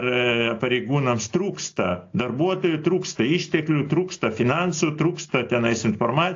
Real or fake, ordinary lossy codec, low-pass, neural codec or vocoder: real; AAC, 48 kbps; 7.2 kHz; none